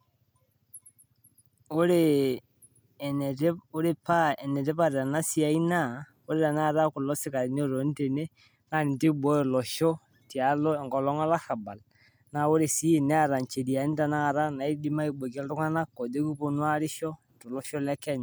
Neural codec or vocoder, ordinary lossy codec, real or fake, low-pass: none; none; real; none